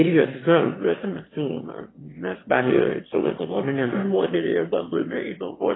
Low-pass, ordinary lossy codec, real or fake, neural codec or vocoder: 7.2 kHz; AAC, 16 kbps; fake; autoencoder, 22.05 kHz, a latent of 192 numbers a frame, VITS, trained on one speaker